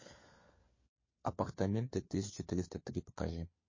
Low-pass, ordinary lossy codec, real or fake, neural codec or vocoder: 7.2 kHz; MP3, 32 kbps; fake; codec, 16 kHz, 4 kbps, FunCodec, trained on LibriTTS, 50 frames a second